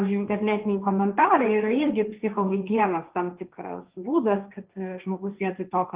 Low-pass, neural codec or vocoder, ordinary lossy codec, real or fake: 3.6 kHz; codec, 16 kHz, 1.1 kbps, Voila-Tokenizer; Opus, 32 kbps; fake